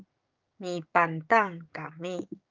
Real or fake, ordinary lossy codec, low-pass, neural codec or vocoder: fake; Opus, 24 kbps; 7.2 kHz; codec, 16 kHz, 6 kbps, DAC